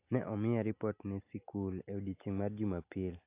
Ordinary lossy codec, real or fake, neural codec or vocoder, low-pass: AAC, 24 kbps; real; none; 3.6 kHz